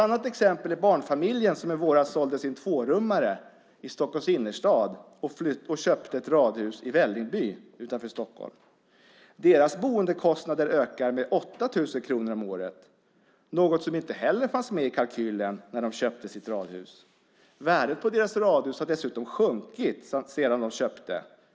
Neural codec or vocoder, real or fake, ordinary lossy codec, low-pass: none; real; none; none